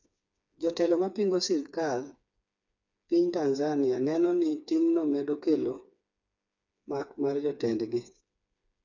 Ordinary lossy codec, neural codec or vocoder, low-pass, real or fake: none; codec, 16 kHz, 4 kbps, FreqCodec, smaller model; 7.2 kHz; fake